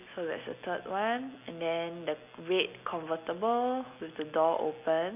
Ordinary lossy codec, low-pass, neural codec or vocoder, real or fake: none; 3.6 kHz; none; real